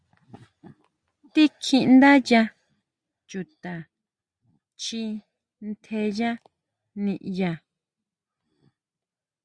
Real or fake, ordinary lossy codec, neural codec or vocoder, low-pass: real; Opus, 64 kbps; none; 9.9 kHz